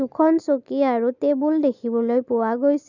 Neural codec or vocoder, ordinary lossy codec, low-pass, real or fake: none; none; 7.2 kHz; real